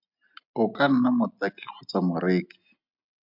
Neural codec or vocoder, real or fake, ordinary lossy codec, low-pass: none; real; MP3, 48 kbps; 5.4 kHz